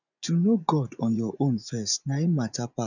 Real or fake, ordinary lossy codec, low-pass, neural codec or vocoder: fake; none; 7.2 kHz; vocoder, 24 kHz, 100 mel bands, Vocos